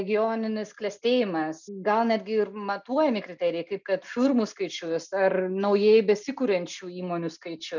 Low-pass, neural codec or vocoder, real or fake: 7.2 kHz; none; real